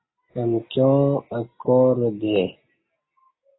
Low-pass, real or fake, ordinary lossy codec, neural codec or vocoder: 7.2 kHz; real; AAC, 16 kbps; none